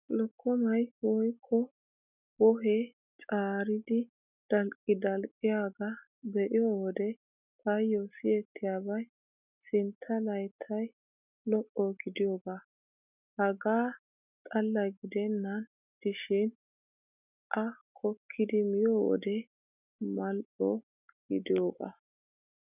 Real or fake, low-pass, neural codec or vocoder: real; 3.6 kHz; none